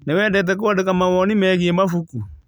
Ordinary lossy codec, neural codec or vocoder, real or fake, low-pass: none; none; real; none